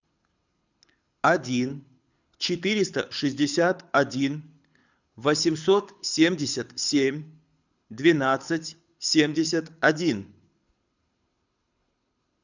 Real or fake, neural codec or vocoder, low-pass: fake; codec, 24 kHz, 6 kbps, HILCodec; 7.2 kHz